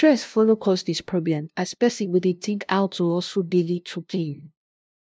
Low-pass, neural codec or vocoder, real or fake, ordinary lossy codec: none; codec, 16 kHz, 0.5 kbps, FunCodec, trained on LibriTTS, 25 frames a second; fake; none